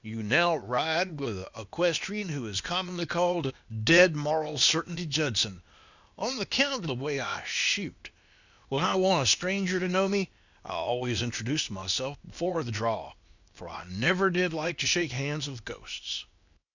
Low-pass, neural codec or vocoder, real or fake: 7.2 kHz; codec, 16 kHz, 0.8 kbps, ZipCodec; fake